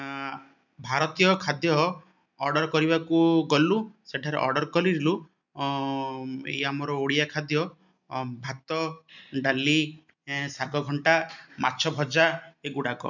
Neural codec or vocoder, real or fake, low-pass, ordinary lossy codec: none; real; 7.2 kHz; none